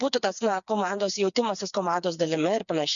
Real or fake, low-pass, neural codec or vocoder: fake; 7.2 kHz; codec, 16 kHz, 4 kbps, FreqCodec, smaller model